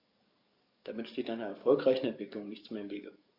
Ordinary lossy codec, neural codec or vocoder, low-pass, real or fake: AAC, 48 kbps; codec, 44.1 kHz, 7.8 kbps, DAC; 5.4 kHz; fake